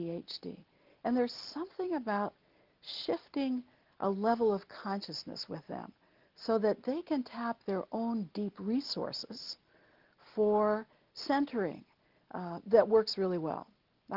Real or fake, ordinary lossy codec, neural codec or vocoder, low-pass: real; Opus, 32 kbps; none; 5.4 kHz